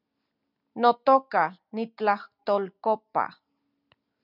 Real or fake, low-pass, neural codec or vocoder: real; 5.4 kHz; none